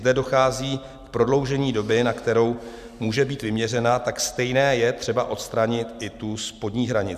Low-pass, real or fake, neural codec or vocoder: 14.4 kHz; real; none